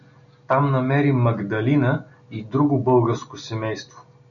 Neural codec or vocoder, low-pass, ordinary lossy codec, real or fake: none; 7.2 kHz; MP3, 96 kbps; real